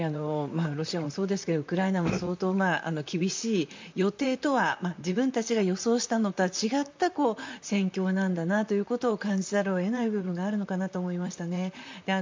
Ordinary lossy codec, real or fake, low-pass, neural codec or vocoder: MP3, 64 kbps; fake; 7.2 kHz; vocoder, 44.1 kHz, 128 mel bands, Pupu-Vocoder